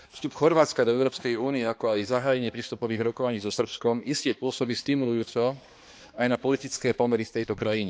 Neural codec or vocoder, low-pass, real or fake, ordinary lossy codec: codec, 16 kHz, 2 kbps, X-Codec, HuBERT features, trained on balanced general audio; none; fake; none